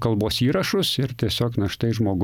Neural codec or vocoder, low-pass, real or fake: none; 19.8 kHz; real